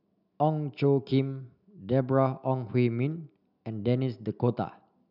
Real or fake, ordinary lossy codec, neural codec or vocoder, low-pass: real; none; none; 5.4 kHz